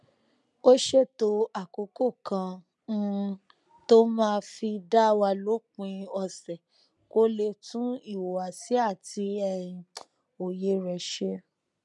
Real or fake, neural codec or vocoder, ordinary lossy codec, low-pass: real; none; none; 10.8 kHz